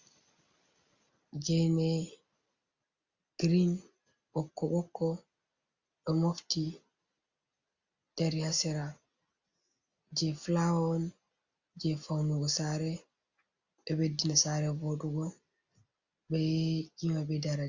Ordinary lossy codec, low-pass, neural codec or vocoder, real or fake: Opus, 64 kbps; 7.2 kHz; none; real